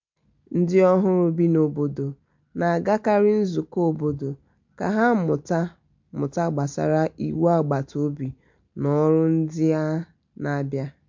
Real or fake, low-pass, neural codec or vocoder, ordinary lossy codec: real; 7.2 kHz; none; MP3, 48 kbps